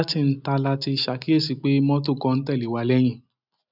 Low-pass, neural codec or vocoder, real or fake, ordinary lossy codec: 5.4 kHz; none; real; none